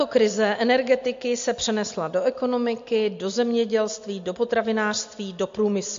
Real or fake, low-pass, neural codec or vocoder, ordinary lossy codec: real; 7.2 kHz; none; MP3, 48 kbps